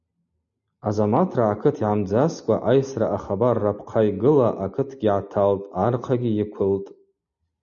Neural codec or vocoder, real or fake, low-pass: none; real; 7.2 kHz